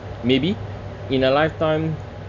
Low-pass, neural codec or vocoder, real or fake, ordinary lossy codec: 7.2 kHz; none; real; none